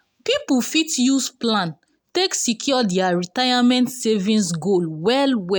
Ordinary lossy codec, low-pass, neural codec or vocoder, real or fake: none; none; none; real